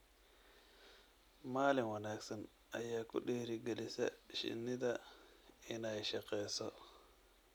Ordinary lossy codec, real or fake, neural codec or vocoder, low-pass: none; real; none; none